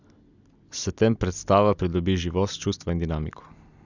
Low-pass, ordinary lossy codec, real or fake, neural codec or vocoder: 7.2 kHz; none; real; none